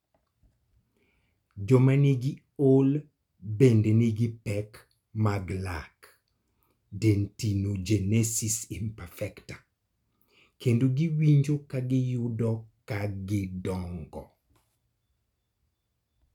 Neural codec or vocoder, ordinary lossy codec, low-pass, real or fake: none; none; 19.8 kHz; real